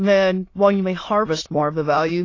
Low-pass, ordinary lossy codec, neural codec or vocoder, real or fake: 7.2 kHz; AAC, 32 kbps; autoencoder, 22.05 kHz, a latent of 192 numbers a frame, VITS, trained on many speakers; fake